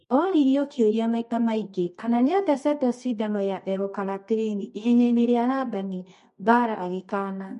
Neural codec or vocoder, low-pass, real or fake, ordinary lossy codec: codec, 24 kHz, 0.9 kbps, WavTokenizer, medium music audio release; 10.8 kHz; fake; MP3, 48 kbps